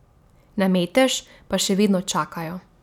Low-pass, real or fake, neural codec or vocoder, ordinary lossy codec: 19.8 kHz; real; none; none